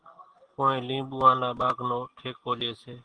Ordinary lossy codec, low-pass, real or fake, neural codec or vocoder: Opus, 16 kbps; 9.9 kHz; real; none